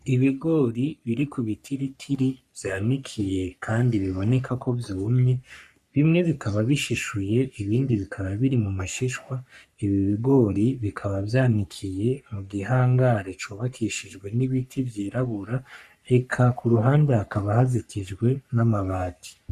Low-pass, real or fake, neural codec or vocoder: 14.4 kHz; fake; codec, 44.1 kHz, 3.4 kbps, Pupu-Codec